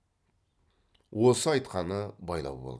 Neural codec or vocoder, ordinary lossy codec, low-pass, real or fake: none; none; none; real